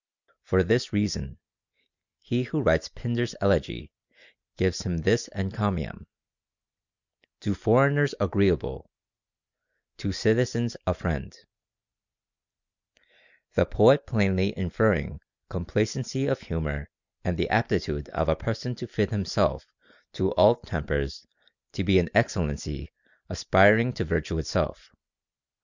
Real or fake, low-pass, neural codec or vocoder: real; 7.2 kHz; none